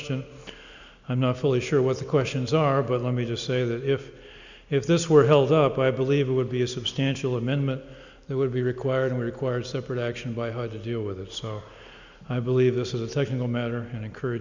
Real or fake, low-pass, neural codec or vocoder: real; 7.2 kHz; none